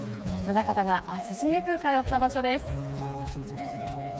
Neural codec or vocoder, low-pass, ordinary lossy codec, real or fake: codec, 16 kHz, 2 kbps, FreqCodec, smaller model; none; none; fake